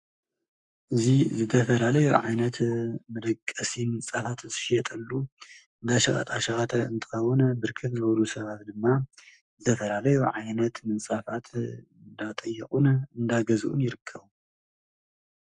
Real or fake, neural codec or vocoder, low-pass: fake; codec, 44.1 kHz, 7.8 kbps, Pupu-Codec; 10.8 kHz